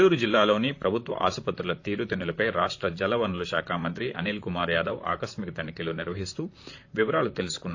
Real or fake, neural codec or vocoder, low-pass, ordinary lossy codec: fake; vocoder, 44.1 kHz, 128 mel bands, Pupu-Vocoder; 7.2 kHz; AAC, 48 kbps